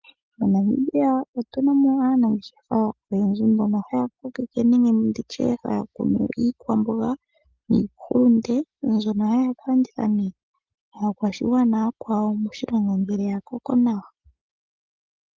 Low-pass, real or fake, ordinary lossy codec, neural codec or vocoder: 7.2 kHz; real; Opus, 32 kbps; none